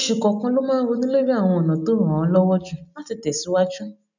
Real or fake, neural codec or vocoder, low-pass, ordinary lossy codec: real; none; 7.2 kHz; none